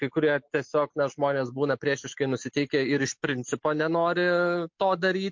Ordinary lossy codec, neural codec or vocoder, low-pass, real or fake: MP3, 48 kbps; none; 7.2 kHz; real